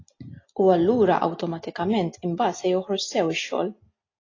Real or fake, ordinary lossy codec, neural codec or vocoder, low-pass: real; AAC, 32 kbps; none; 7.2 kHz